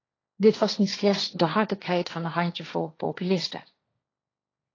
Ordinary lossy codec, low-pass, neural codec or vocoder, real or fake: AAC, 32 kbps; 7.2 kHz; codec, 16 kHz, 1.1 kbps, Voila-Tokenizer; fake